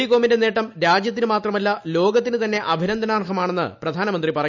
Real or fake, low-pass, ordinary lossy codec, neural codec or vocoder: real; 7.2 kHz; none; none